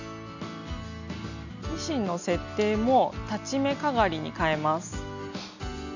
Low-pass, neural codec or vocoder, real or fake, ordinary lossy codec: 7.2 kHz; none; real; none